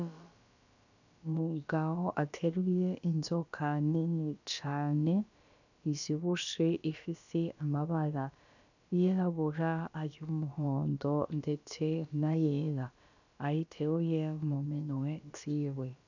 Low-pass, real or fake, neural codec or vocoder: 7.2 kHz; fake; codec, 16 kHz, about 1 kbps, DyCAST, with the encoder's durations